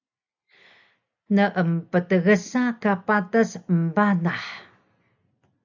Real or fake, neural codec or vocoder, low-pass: real; none; 7.2 kHz